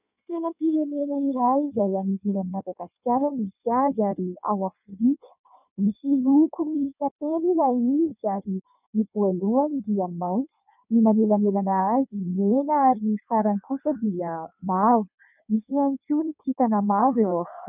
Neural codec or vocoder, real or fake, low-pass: codec, 16 kHz in and 24 kHz out, 1.1 kbps, FireRedTTS-2 codec; fake; 3.6 kHz